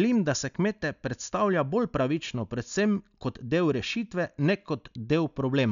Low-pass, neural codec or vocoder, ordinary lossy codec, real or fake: 7.2 kHz; none; none; real